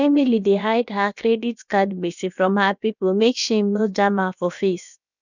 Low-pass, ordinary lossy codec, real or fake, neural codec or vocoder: 7.2 kHz; none; fake; codec, 16 kHz, about 1 kbps, DyCAST, with the encoder's durations